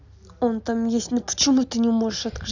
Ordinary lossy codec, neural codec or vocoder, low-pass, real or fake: none; none; 7.2 kHz; real